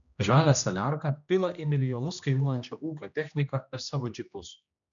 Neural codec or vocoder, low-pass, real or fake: codec, 16 kHz, 1 kbps, X-Codec, HuBERT features, trained on general audio; 7.2 kHz; fake